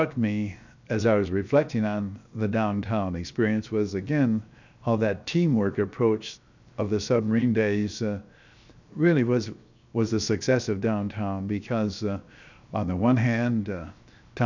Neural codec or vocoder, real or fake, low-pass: codec, 16 kHz, 0.7 kbps, FocalCodec; fake; 7.2 kHz